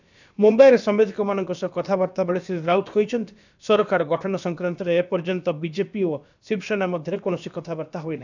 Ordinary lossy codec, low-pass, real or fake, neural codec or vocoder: none; 7.2 kHz; fake; codec, 16 kHz, about 1 kbps, DyCAST, with the encoder's durations